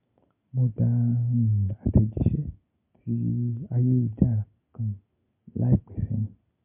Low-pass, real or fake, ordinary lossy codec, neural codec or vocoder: 3.6 kHz; real; MP3, 32 kbps; none